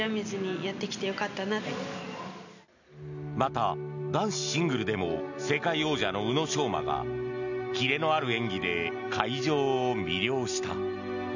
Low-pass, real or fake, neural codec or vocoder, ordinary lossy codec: 7.2 kHz; real; none; none